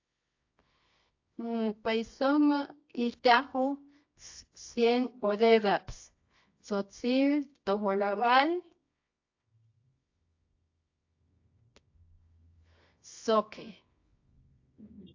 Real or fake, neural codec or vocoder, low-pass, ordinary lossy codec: fake; codec, 24 kHz, 0.9 kbps, WavTokenizer, medium music audio release; 7.2 kHz; AAC, 48 kbps